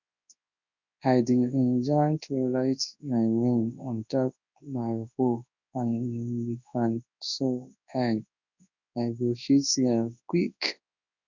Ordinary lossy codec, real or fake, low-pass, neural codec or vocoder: none; fake; 7.2 kHz; codec, 24 kHz, 0.9 kbps, WavTokenizer, large speech release